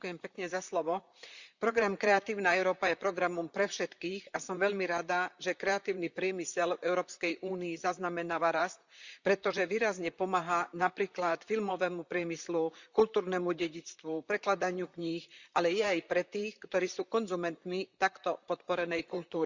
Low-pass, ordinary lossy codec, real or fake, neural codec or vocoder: 7.2 kHz; Opus, 64 kbps; fake; vocoder, 44.1 kHz, 128 mel bands, Pupu-Vocoder